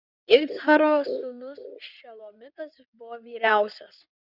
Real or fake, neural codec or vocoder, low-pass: fake; codec, 16 kHz in and 24 kHz out, 2.2 kbps, FireRedTTS-2 codec; 5.4 kHz